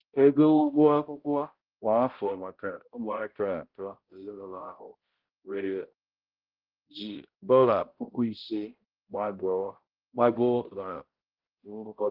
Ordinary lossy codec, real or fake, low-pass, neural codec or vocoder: Opus, 16 kbps; fake; 5.4 kHz; codec, 16 kHz, 0.5 kbps, X-Codec, HuBERT features, trained on balanced general audio